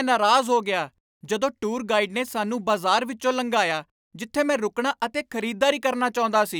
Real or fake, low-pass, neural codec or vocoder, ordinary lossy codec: real; none; none; none